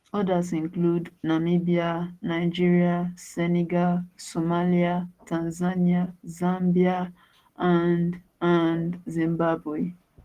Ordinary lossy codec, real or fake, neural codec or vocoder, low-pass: Opus, 16 kbps; real; none; 14.4 kHz